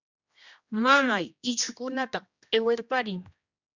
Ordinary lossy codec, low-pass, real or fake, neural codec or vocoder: Opus, 64 kbps; 7.2 kHz; fake; codec, 16 kHz, 1 kbps, X-Codec, HuBERT features, trained on general audio